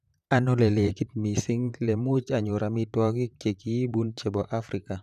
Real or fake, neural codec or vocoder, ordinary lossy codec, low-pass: fake; vocoder, 44.1 kHz, 128 mel bands, Pupu-Vocoder; none; 14.4 kHz